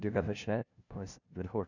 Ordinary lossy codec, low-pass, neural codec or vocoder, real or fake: none; 7.2 kHz; codec, 16 kHz, 0.5 kbps, FunCodec, trained on LibriTTS, 25 frames a second; fake